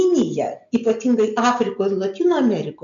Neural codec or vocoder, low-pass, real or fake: none; 7.2 kHz; real